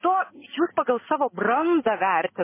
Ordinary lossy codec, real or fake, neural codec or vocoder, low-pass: MP3, 16 kbps; real; none; 3.6 kHz